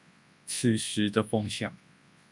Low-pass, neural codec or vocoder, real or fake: 10.8 kHz; codec, 24 kHz, 0.9 kbps, WavTokenizer, large speech release; fake